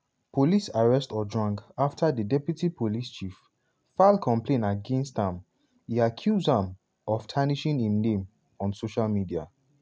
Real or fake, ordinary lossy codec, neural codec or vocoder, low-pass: real; none; none; none